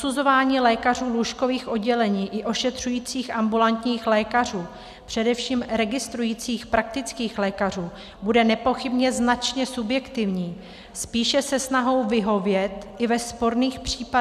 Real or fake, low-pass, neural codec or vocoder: real; 14.4 kHz; none